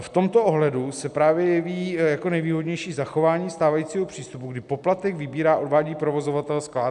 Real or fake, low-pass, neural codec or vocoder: real; 10.8 kHz; none